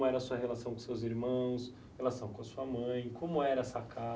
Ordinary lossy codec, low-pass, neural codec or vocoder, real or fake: none; none; none; real